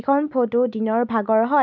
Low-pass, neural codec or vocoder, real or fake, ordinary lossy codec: 7.2 kHz; none; real; none